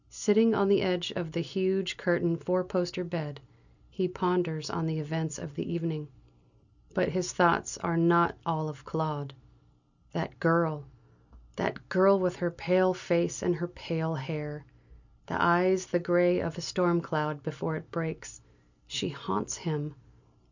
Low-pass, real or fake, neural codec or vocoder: 7.2 kHz; real; none